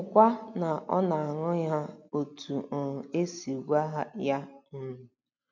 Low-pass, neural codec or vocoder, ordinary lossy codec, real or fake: 7.2 kHz; none; none; real